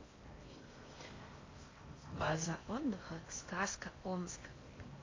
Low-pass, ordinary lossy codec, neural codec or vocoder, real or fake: 7.2 kHz; AAC, 32 kbps; codec, 16 kHz in and 24 kHz out, 0.6 kbps, FocalCodec, streaming, 4096 codes; fake